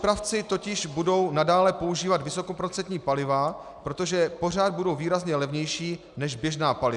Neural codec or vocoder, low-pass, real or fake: none; 10.8 kHz; real